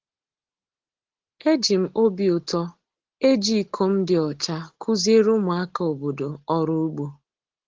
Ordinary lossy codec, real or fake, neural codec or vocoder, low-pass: Opus, 16 kbps; real; none; 7.2 kHz